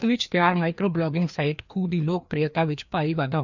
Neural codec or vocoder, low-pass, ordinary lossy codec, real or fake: codec, 16 kHz, 2 kbps, FreqCodec, larger model; 7.2 kHz; none; fake